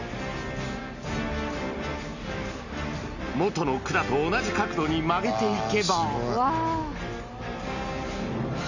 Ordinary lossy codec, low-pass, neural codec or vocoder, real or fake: none; 7.2 kHz; none; real